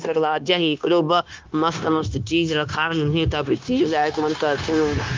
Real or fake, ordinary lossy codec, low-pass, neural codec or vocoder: fake; Opus, 24 kbps; 7.2 kHz; codec, 16 kHz, 0.9 kbps, LongCat-Audio-Codec